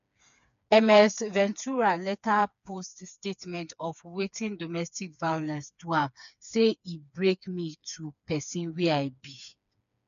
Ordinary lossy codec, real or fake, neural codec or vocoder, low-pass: none; fake; codec, 16 kHz, 4 kbps, FreqCodec, smaller model; 7.2 kHz